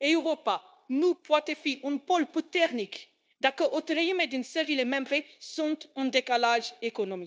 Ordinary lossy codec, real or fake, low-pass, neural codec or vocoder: none; fake; none; codec, 16 kHz, 0.9 kbps, LongCat-Audio-Codec